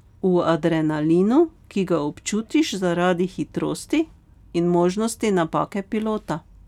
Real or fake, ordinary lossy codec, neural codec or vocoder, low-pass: real; none; none; 19.8 kHz